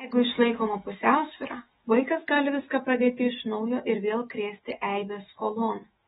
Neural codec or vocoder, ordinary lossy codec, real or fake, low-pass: autoencoder, 48 kHz, 128 numbers a frame, DAC-VAE, trained on Japanese speech; AAC, 16 kbps; fake; 19.8 kHz